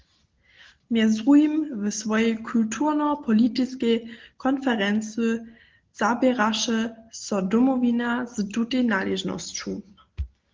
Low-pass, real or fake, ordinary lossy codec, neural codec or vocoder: 7.2 kHz; real; Opus, 16 kbps; none